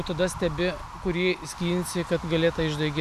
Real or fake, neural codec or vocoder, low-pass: real; none; 14.4 kHz